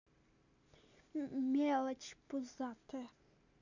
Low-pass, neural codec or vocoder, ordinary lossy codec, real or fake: 7.2 kHz; none; none; real